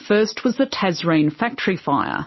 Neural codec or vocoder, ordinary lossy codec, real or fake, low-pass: none; MP3, 24 kbps; real; 7.2 kHz